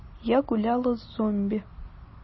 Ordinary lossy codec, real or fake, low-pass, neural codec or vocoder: MP3, 24 kbps; real; 7.2 kHz; none